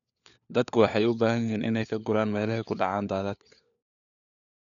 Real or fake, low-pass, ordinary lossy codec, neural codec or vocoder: fake; 7.2 kHz; none; codec, 16 kHz, 4 kbps, FunCodec, trained on LibriTTS, 50 frames a second